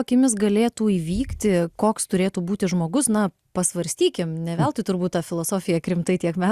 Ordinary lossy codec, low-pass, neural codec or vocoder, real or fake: Opus, 64 kbps; 14.4 kHz; none; real